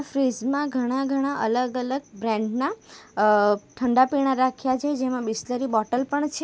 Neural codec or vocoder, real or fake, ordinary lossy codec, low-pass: none; real; none; none